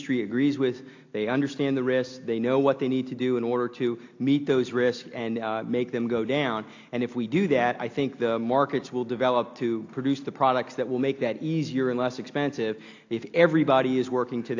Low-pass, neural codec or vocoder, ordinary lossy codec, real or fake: 7.2 kHz; none; AAC, 48 kbps; real